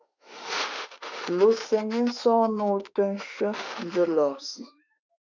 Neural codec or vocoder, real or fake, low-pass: autoencoder, 48 kHz, 32 numbers a frame, DAC-VAE, trained on Japanese speech; fake; 7.2 kHz